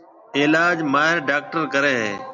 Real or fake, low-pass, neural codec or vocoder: real; 7.2 kHz; none